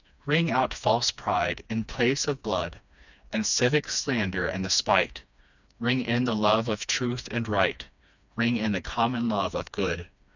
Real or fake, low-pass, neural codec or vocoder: fake; 7.2 kHz; codec, 16 kHz, 2 kbps, FreqCodec, smaller model